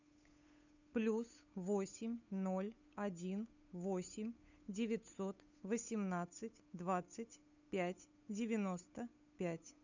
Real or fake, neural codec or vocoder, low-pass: fake; codec, 16 kHz, 8 kbps, FunCodec, trained on Chinese and English, 25 frames a second; 7.2 kHz